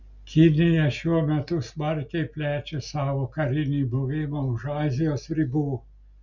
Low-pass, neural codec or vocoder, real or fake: 7.2 kHz; none; real